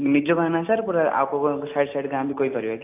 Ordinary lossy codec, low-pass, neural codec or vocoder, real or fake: AAC, 24 kbps; 3.6 kHz; none; real